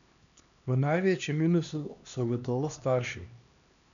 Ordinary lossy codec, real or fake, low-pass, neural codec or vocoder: none; fake; 7.2 kHz; codec, 16 kHz, 2 kbps, X-Codec, HuBERT features, trained on LibriSpeech